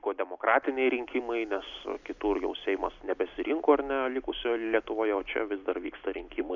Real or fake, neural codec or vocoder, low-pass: real; none; 7.2 kHz